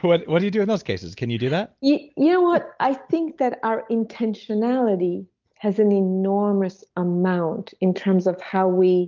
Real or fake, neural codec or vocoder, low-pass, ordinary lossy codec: real; none; 7.2 kHz; Opus, 32 kbps